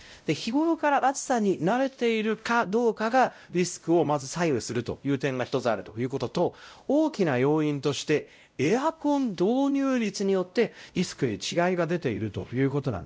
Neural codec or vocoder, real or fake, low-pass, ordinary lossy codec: codec, 16 kHz, 0.5 kbps, X-Codec, WavLM features, trained on Multilingual LibriSpeech; fake; none; none